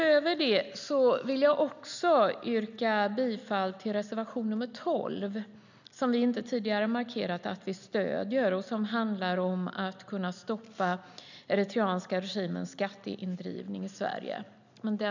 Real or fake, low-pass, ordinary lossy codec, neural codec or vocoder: real; 7.2 kHz; none; none